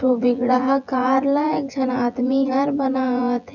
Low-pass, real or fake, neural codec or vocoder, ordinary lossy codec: 7.2 kHz; fake; vocoder, 24 kHz, 100 mel bands, Vocos; none